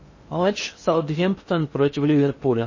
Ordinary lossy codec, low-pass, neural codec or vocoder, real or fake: MP3, 32 kbps; 7.2 kHz; codec, 16 kHz in and 24 kHz out, 0.6 kbps, FocalCodec, streaming, 2048 codes; fake